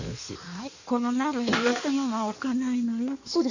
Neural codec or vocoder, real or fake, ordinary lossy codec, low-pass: codec, 16 kHz in and 24 kHz out, 1.1 kbps, FireRedTTS-2 codec; fake; none; 7.2 kHz